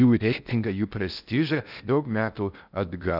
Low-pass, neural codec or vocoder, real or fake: 5.4 kHz; codec, 16 kHz in and 24 kHz out, 0.6 kbps, FocalCodec, streaming, 4096 codes; fake